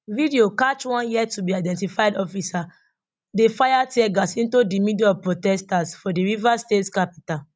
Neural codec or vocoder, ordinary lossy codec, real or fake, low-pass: none; none; real; none